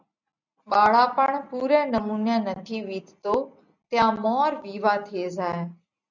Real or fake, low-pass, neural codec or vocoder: real; 7.2 kHz; none